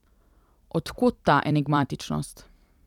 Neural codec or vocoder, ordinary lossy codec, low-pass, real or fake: vocoder, 44.1 kHz, 128 mel bands every 256 samples, BigVGAN v2; none; 19.8 kHz; fake